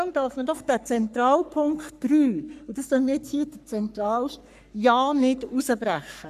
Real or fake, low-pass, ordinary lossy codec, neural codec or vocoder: fake; 14.4 kHz; none; codec, 44.1 kHz, 3.4 kbps, Pupu-Codec